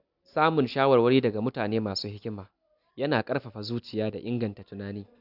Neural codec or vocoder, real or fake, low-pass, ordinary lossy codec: none; real; 5.4 kHz; none